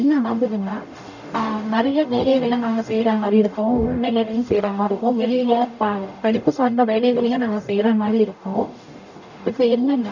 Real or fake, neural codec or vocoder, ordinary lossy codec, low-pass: fake; codec, 44.1 kHz, 0.9 kbps, DAC; none; 7.2 kHz